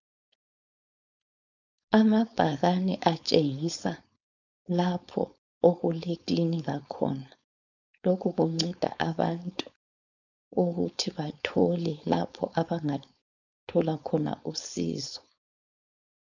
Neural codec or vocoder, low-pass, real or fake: codec, 16 kHz, 4.8 kbps, FACodec; 7.2 kHz; fake